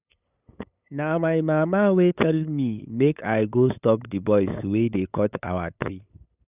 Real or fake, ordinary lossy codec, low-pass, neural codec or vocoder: fake; none; 3.6 kHz; codec, 16 kHz, 8 kbps, FunCodec, trained on LibriTTS, 25 frames a second